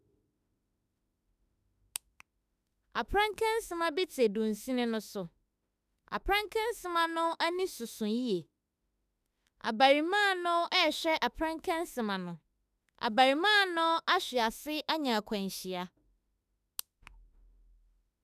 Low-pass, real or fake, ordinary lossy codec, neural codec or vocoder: 14.4 kHz; fake; none; autoencoder, 48 kHz, 32 numbers a frame, DAC-VAE, trained on Japanese speech